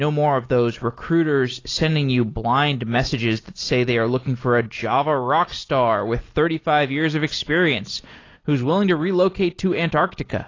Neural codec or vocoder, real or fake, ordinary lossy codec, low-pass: none; real; AAC, 32 kbps; 7.2 kHz